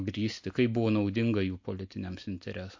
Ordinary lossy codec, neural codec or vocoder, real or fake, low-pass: MP3, 48 kbps; none; real; 7.2 kHz